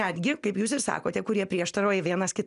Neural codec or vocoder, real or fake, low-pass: none; real; 10.8 kHz